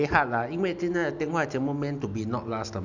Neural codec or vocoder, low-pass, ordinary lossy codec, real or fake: none; 7.2 kHz; none; real